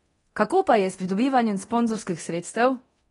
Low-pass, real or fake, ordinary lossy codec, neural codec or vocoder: 10.8 kHz; fake; AAC, 32 kbps; codec, 24 kHz, 0.9 kbps, DualCodec